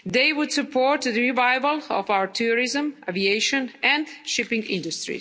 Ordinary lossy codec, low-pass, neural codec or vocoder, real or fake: none; none; none; real